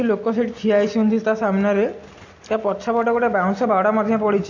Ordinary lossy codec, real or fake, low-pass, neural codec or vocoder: AAC, 48 kbps; real; 7.2 kHz; none